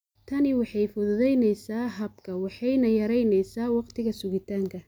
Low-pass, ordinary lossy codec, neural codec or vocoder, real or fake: none; none; none; real